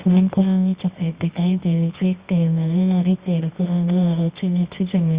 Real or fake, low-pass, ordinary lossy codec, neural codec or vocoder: fake; 3.6 kHz; Opus, 64 kbps; codec, 24 kHz, 0.9 kbps, WavTokenizer, medium music audio release